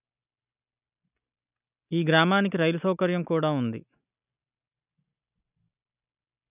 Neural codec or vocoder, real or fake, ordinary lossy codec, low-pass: none; real; none; 3.6 kHz